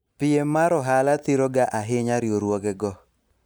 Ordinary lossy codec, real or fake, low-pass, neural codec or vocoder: none; real; none; none